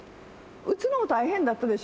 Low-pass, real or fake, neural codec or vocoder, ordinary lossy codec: none; real; none; none